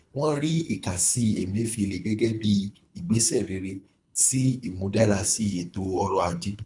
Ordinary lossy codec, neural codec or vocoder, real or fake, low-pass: MP3, 96 kbps; codec, 24 kHz, 3 kbps, HILCodec; fake; 10.8 kHz